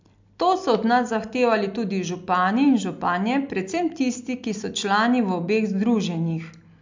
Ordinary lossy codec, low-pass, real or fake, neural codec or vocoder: MP3, 64 kbps; 7.2 kHz; real; none